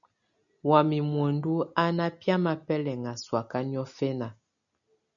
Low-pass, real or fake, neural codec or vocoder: 7.2 kHz; real; none